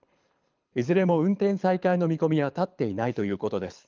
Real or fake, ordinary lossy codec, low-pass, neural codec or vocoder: fake; Opus, 24 kbps; 7.2 kHz; codec, 24 kHz, 6 kbps, HILCodec